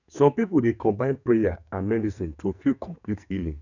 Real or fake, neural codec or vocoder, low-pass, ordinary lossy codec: fake; codec, 44.1 kHz, 2.6 kbps, SNAC; 7.2 kHz; none